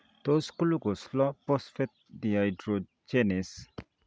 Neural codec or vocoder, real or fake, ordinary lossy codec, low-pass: none; real; none; none